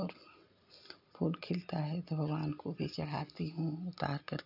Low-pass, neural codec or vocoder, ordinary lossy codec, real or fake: 5.4 kHz; none; none; real